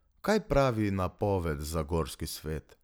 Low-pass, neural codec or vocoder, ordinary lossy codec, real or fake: none; none; none; real